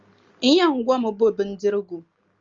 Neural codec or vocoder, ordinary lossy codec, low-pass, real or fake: none; Opus, 32 kbps; 7.2 kHz; real